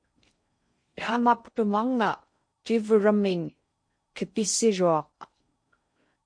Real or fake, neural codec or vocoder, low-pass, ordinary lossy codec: fake; codec, 16 kHz in and 24 kHz out, 0.6 kbps, FocalCodec, streaming, 4096 codes; 9.9 kHz; MP3, 48 kbps